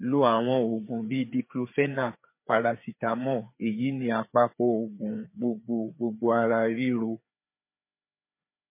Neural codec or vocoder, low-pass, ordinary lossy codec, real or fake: codec, 16 kHz, 4 kbps, FreqCodec, larger model; 3.6 kHz; MP3, 24 kbps; fake